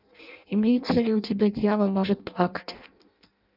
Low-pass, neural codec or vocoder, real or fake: 5.4 kHz; codec, 16 kHz in and 24 kHz out, 0.6 kbps, FireRedTTS-2 codec; fake